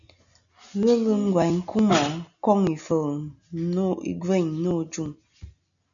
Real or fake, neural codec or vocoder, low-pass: real; none; 7.2 kHz